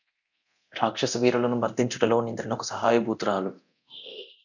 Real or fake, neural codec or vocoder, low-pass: fake; codec, 24 kHz, 0.9 kbps, DualCodec; 7.2 kHz